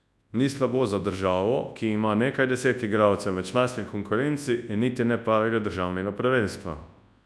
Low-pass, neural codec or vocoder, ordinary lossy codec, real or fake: none; codec, 24 kHz, 0.9 kbps, WavTokenizer, large speech release; none; fake